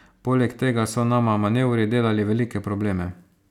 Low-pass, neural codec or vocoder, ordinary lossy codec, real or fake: 19.8 kHz; none; none; real